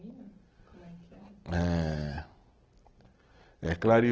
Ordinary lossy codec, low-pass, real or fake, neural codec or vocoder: Opus, 16 kbps; 7.2 kHz; real; none